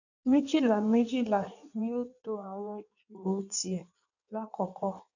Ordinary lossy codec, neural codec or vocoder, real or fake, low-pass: none; codec, 16 kHz in and 24 kHz out, 1.1 kbps, FireRedTTS-2 codec; fake; 7.2 kHz